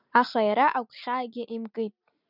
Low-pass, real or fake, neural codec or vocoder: 5.4 kHz; real; none